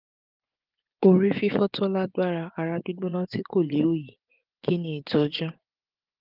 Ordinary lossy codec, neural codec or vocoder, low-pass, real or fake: Opus, 32 kbps; vocoder, 24 kHz, 100 mel bands, Vocos; 5.4 kHz; fake